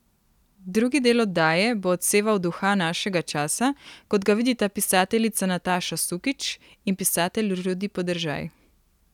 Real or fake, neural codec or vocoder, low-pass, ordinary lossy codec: real; none; 19.8 kHz; none